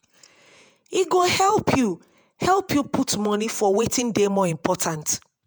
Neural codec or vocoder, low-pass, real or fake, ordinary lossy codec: vocoder, 48 kHz, 128 mel bands, Vocos; none; fake; none